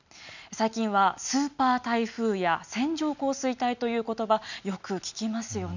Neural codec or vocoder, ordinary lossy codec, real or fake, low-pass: none; none; real; 7.2 kHz